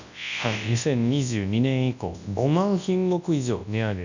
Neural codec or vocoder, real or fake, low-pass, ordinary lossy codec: codec, 24 kHz, 0.9 kbps, WavTokenizer, large speech release; fake; 7.2 kHz; none